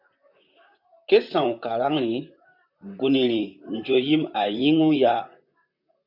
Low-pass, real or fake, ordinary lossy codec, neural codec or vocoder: 5.4 kHz; fake; MP3, 48 kbps; vocoder, 44.1 kHz, 128 mel bands, Pupu-Vocoder